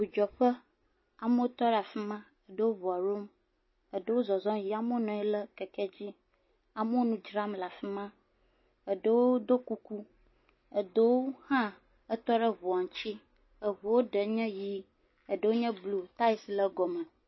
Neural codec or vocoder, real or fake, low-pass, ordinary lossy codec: none; real; 7.2 kHz; MP3, 24 kbps